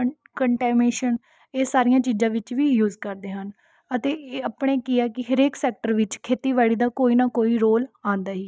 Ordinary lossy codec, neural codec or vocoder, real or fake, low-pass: none; none; real; none